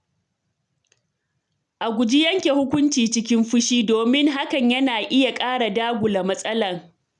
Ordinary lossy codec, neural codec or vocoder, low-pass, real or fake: none; none; 10.8 kHz; real